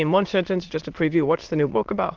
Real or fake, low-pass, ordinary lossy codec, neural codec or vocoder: fake; 7.2 kHz; Opus, 16 kbps; autoencoder, 22.05 kHz, a latent of 192 numbers a frame, VITS, trained on many speakers